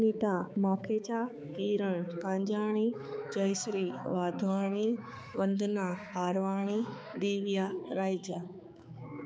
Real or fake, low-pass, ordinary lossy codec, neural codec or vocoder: fake; none; none; codec, 16 kHz, 4 kbps, X-Codec, HuBERT features, trained on balanced general audio